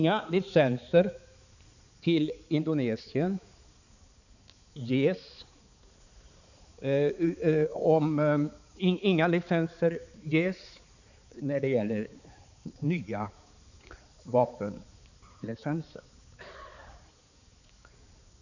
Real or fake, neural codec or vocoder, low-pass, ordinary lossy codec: fake; codec, 16 kHz, 4 kbps, X-Codec, HuBERT features, trained on balanced general audio; 7.2 kHz; none